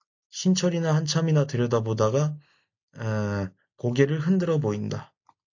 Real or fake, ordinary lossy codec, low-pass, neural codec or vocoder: real; AAC, 48 kbps; 7.2 kHz; none